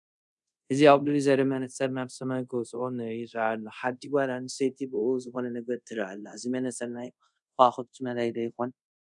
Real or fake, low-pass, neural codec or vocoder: fake; 10.8 kHz; codec, 24 kHz, 0.5 kbps, DualCodec